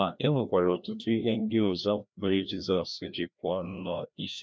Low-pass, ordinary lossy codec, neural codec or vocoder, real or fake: none; none; codec, 16 kHz, 1 kbps, FreqCodec, larger model; fake